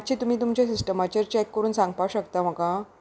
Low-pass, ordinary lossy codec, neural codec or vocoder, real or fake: none; none; none; real